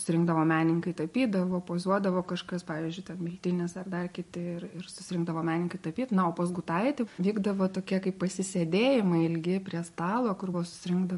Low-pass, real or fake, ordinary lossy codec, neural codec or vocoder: 14.4 kHz; real; MP3, 48 kbps; none